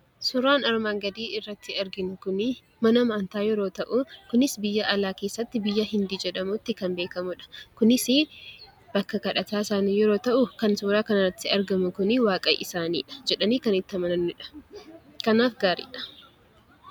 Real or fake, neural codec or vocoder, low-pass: real; none; 19.8 kHz